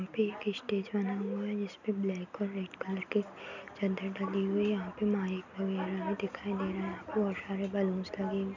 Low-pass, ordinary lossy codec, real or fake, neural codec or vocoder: 7.2 kHz; none; real; none